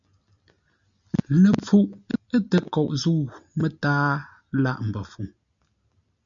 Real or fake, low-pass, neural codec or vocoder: real; 7.2 kHz; none